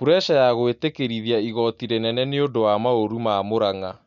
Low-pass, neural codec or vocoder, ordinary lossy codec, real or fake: 7.2 kHz; none; MP3, 96 kbps; real